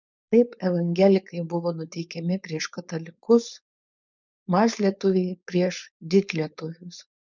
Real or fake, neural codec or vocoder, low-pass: fake; codec, 16 kHz, 4.8 kbps, FACodec; 7.2 kHz